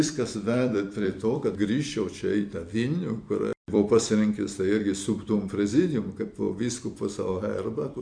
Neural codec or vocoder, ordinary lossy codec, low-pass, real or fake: vocoder, 44.1 kHz, 128 mel bands every 256 samples, BigVGAN v2; MP3, 64 kbps; 9.9 kHz; fake